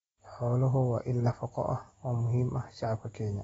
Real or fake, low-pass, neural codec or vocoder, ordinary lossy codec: real; 19.8 kHz; none; AAC, 24 kbps